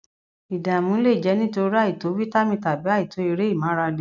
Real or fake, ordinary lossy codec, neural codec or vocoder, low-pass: real; none; none; 7.2 kHz